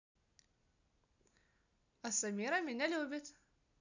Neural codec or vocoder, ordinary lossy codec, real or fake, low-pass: none; none; real; 7.2 kHz